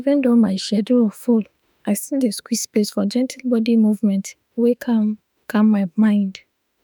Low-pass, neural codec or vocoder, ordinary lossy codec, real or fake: none; autoencoder, 48 kHz, 32 numbers a frame, DAC-VAE, trained on Japanese speech; none; fake